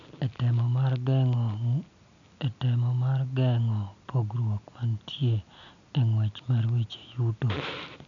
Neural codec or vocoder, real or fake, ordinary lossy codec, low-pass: none; real; none; 7.2 kHz